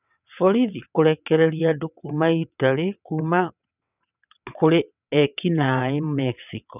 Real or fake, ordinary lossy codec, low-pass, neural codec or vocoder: fake; none; 3.6 kHz; vocoder, 22.05 kHz, 80 mel bands, WaveNeXt